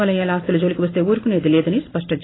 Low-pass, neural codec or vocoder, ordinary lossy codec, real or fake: 7.2 kHz; none; AAC, 16 kbps; real